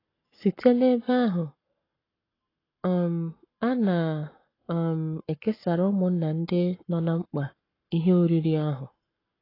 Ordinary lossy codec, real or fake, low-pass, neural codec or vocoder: AAC, 24 kbps; fake; 5.4 kHz; codec, 44.1 kHz, 7.8 kbps, Pupu-Codec